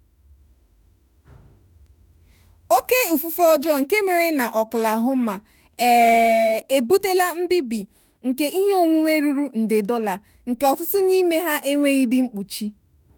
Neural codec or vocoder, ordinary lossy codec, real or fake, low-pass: autoencoder, 48 kHz, 32 numbers a frame, DAC-VAE, trained on Japanese speech; none; fake; none